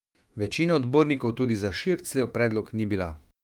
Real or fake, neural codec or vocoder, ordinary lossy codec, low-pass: fake; autoencoder, 48 kHz, 32 numbers a frame, DAC-VAE, trained on Japanese speech; Opus, 32 kbps; 19.8 kHz